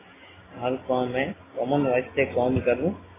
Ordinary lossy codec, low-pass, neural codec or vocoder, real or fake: AAC, 16 kbps; 3.6 kHz; none; real